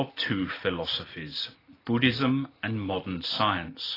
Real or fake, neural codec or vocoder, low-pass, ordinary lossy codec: real; none; 5.4 kHz; AAC, 24 kbps